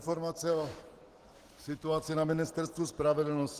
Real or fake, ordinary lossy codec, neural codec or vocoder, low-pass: real; Opus, 16 kbps; none; 14.4 kHz